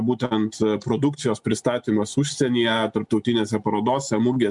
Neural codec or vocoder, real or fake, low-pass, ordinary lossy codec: none; real; 10.8 kHz; MP3, 96 kbps